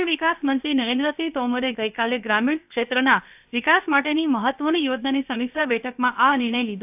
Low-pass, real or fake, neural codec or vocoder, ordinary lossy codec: 3.6 kHz; fake; codec, 16 kHz, about 1 kbps, DyCAST, with the encoder's durations; AAC, 32 kbps